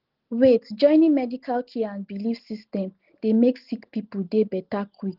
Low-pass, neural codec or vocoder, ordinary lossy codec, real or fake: 5.4 kHz; none; Opus, 16 kbps; real